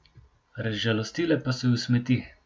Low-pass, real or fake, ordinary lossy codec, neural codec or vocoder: none; real; none; none